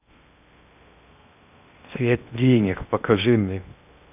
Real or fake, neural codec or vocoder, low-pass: fake; codec, 16 kHz in and 24 kHz out, 0.6 kbps, FocalCodec, streaming, 2048 codes; 3.6 kHz